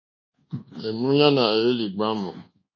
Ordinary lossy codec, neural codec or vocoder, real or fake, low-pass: MP3, 32 kbps; codec, 24 kHz, 1.2 kbps, DualCodec; fake; 7.2 kHz